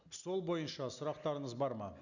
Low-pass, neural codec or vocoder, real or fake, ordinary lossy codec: 7.2 kHz; none; real; none